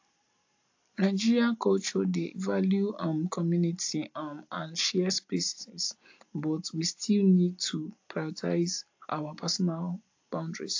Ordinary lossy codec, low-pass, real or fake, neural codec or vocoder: AAC, 48 kbps; 7.2 kHz; real; none